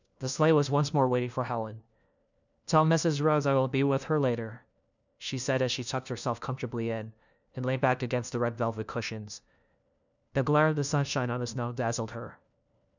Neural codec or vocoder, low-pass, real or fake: codec, 16 kHz, 1 kbps, FunCodec, trained on LibriTTS, 50 frames a second; 7.2 kHz; fake